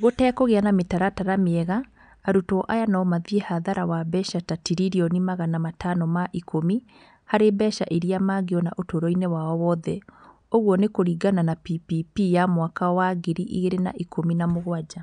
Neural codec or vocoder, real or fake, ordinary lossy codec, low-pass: none; real; none; 9.9 kHz